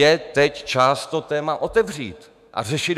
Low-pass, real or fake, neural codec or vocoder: 14.4 kHz; fake; autoencoder, 48 kHz, 128 numbers a frame, DAC-VAE, trained on Japanese speech